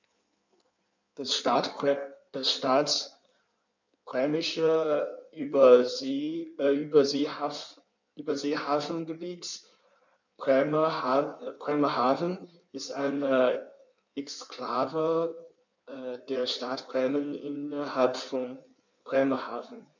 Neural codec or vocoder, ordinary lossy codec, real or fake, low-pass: codec, 16 kHz in and 24 kHz out, 1.1 kbps, FireRedTTS-2 codec; none; fake; 7.2 kHz